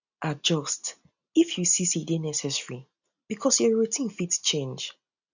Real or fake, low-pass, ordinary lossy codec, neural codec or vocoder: real; 7.2 kHz; none; none